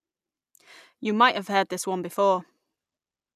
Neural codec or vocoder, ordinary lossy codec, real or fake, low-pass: none; none; real; 14.4 kHz